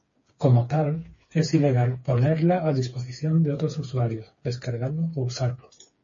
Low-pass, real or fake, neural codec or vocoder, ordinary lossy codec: 7.2 kHz; fake; codec, 16 kHz, 4 kbps, FreqCodec, smaller model; MP3, 32 kbps